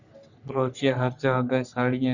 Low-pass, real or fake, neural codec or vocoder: 7.2 kHz; fake; codec, 44.1 kHz, 3.4 kbps, Pupu-Codec